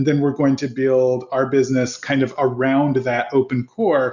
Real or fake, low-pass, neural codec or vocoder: real; 7.2 kHz; none